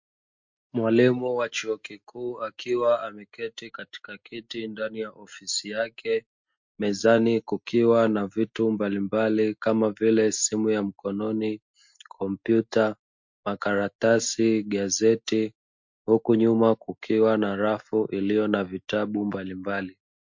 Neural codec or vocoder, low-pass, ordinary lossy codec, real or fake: none; 7.2 kHz; MP3, 48 kbps; real